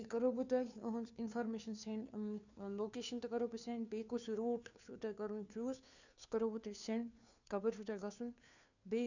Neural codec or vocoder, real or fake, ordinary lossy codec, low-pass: codec, 16 kHz, 2 kbps, FunCodec, trained on Chinese and English, 25 frames a second; fake; none; 7.2 kHz